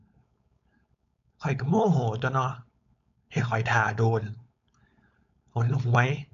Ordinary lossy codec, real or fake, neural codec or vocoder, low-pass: MP3, 96 kbps; fake; codec, 16 kHz, 4.8 kbps, FACodec; 7.2 kHz